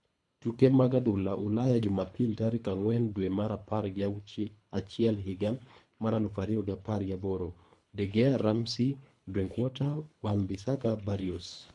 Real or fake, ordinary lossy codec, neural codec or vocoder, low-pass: fake; MP3, 64 kbps; codec, 24 kHz, 3 kbps, HILCodec; 10.8 kHz